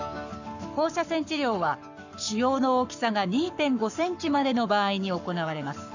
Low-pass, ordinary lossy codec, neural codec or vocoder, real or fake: 7.2 kHz; none; codec, 44.1 kHz, 7.8 kbps, Pupu-Codec; fake